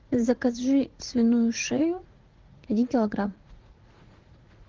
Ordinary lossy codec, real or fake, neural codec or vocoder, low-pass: Opus, 16 kbps; fake; vocoder, 44.1 kHz, 80 mel bands, Vocos; 7.2 kHz